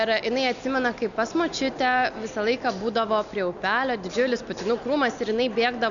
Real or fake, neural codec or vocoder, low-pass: real; none; 7.2 kHz